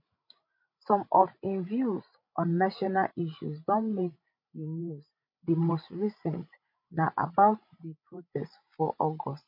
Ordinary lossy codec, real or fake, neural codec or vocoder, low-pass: MP3, 32 kbps; fake; codec, 16 kHz, 16 kbps, FreqCodec, larger model; 5.4 kHz